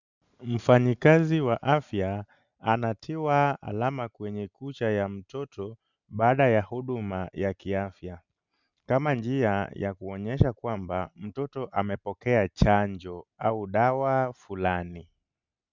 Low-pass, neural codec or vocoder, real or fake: 7.2 kHz; none; real